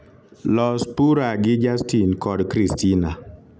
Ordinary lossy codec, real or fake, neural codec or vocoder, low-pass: none; real; none; none